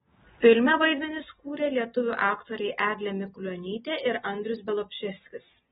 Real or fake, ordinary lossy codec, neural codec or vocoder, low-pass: real; AAC, 16 kbps; none; 19.8 kHz